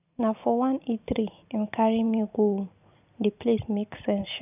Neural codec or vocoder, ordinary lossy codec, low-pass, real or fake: vocoder, 44.1 kHz, 128 mel bands every 512 samples, BigVGAN v2; none; 3.6 kHz; fake